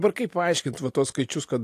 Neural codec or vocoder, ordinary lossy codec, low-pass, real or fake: none; AAC, 64 kbps; 14.4 kHz; real